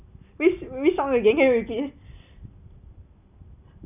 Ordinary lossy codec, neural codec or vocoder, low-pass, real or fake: none; none; 3.6 kHz; real